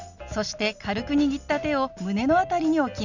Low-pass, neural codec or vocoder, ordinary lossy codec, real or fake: 7.2 kHz; none; none; real